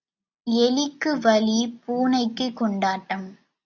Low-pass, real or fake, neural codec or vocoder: 7.2 kHz; real; none